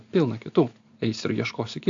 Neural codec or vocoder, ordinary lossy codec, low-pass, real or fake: none; AAC, 64 kbps; 7.2 kHz; real